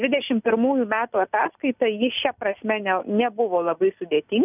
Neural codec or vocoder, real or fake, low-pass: none; real; 3.6 kHz